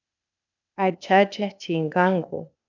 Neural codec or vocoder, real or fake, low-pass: codec, 16 kHz, 0.8 kbps, ZipCodec; fake; 7.2 kHz